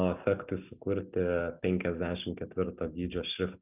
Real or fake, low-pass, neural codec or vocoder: real; 3.6 kHz; none